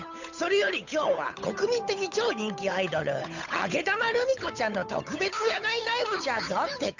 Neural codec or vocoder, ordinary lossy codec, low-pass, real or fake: codec, 16 kHz, 8 kbps, FunCodec, trained on Chinese and English, 25 frames a second; none; 7.2 kHz; fake